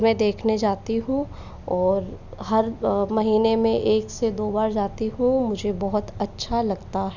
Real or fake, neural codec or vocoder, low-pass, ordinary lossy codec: real; none; 7.2 kHz; none